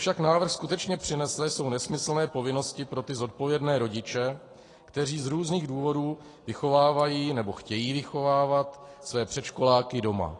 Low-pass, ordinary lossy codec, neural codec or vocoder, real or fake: 10.8 kHz; AAC, 32 kbps; none; real